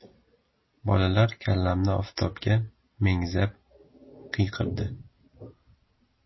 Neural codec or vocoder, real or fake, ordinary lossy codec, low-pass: none; real; MP3, 24 kbps; 7.2 kHz